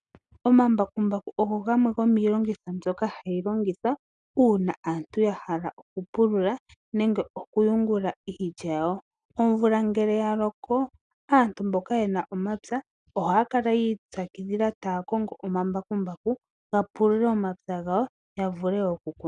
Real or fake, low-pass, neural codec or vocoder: real; 9.9 kHz; none